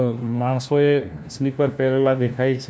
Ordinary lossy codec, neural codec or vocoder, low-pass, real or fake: none; codec, 16 kHz, 1 kbps, FunCodec, trained on LibriTTS, 50 frames a second; none; fake